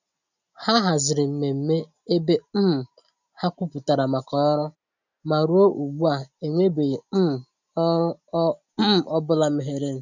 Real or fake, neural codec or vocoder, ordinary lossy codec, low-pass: real; none; none; 7.2 kHz